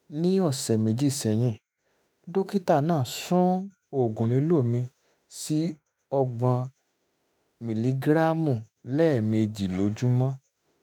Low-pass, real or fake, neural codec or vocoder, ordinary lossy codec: none; fake; autoencoder, 48 kHz, 32 numbers a frame, DAC-VAE, trained on Japanese speech; none